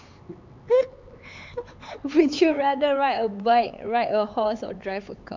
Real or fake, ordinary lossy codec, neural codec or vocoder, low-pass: fake; MP3, 64 kbps; codec, 16 kHz, 4 kbps, X-Codec, HuBERT features, trained on LibriSpeech; 7.2 kHz